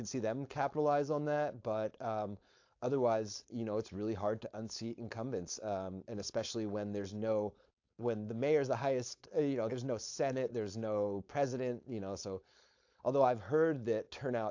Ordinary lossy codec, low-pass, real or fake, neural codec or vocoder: AAC, 48 kbps; 7.2 kHz; fake; codec, 16 kHz, 4.8 kbps, FACodec